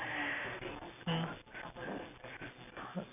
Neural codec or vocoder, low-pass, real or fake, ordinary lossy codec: none; 3.6 kHz; real; none